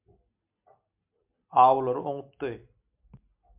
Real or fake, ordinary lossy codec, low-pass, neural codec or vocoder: real; MP3, 32 kbps; 3.6 kHz; none